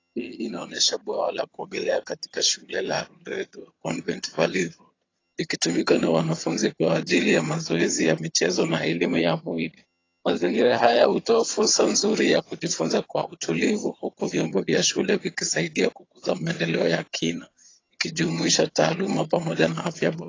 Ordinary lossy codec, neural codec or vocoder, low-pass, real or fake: AAC, 32 kbps; vocoder, 22.05 kHz, 80 mel bands, HiFi-GAN; 7.2 kHz; fake